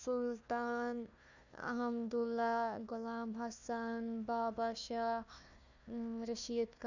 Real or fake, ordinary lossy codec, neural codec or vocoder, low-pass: fake; none; codec, 16 kHz, 1 kbps, FunCodec, trained on Chinese and English, 50 frames a second; 7.2 kHz